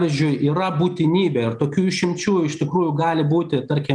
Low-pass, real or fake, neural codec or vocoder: 9.9 kHz; real; none